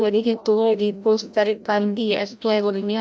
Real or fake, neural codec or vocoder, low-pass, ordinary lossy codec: fake; codec, 16 kHz, 0.5 kbps, FreqCodec, larger model; none; none